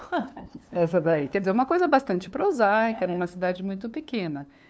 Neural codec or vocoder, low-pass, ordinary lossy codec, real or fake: codec, 16 kHz, 2 kbps, FunCodec, trained on LibriTTS, 25 frames a second; none; none; fake